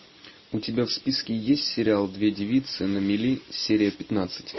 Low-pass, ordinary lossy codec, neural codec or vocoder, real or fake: 7.2 kHz; MP3, 24 kbps; none; real